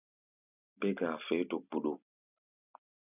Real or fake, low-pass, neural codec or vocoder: real; 3.6 kHz; none